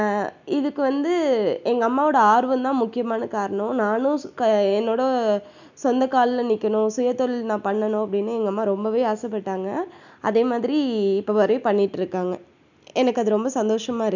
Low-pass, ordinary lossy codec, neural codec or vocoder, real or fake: 7.2 kHz; none; none; real